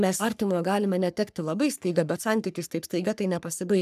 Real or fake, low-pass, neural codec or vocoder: fake; 14.4 kHz; codec, 44.1 kHz, 3.4 kbps, Pupu-Codec